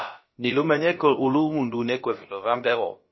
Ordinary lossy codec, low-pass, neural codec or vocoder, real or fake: MP3, 24 kbps; 7.2 kHz; codec, 16 kHz, about 1 kbps, DyCAST, with the encoder's durations; fake